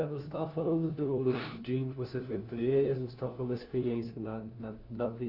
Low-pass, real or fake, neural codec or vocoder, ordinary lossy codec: 5.4 kHz; fake; codec, 16 kHz, 1 kbps, FunCodec, trained on LibriTTS, 50 frames a second; Opus, 32 kbps